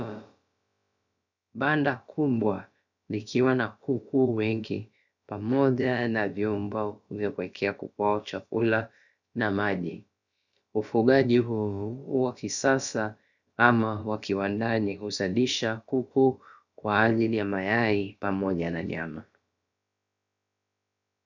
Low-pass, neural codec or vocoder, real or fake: 7.2 kHz; codec, 16 kHz, about 1 kbps, DyCAST, with the encoder's durations; fake